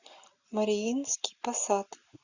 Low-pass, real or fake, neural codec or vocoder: 7.2 kHz; real; none